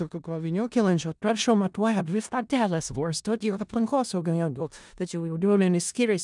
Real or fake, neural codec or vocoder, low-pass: fake; codec, 16 kHz in and 24 kHz out, 0.4 kbps, LongCat-Audio-Codec, four codebook decoder; 10.8 kHz